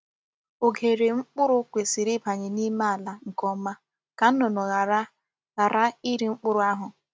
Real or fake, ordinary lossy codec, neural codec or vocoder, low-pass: real; none; none; none